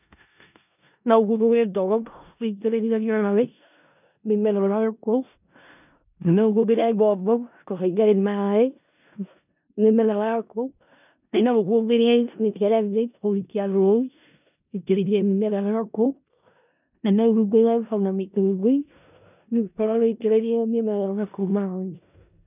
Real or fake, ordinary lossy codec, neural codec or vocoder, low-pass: fake; none; codec, 16 kHz in and 24 kHz out, 0.4 kbps, LongCat-Audio-Codec, four codebook decoder; 3.6 kHz